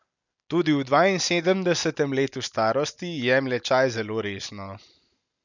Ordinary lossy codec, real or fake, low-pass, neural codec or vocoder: none; real; 7.2 kHz; none